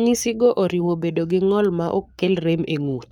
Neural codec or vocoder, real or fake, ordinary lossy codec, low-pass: codec, 44.1 kHz, 7.8 kbps, Pupu-Codec; fake; none; 19.8 kHz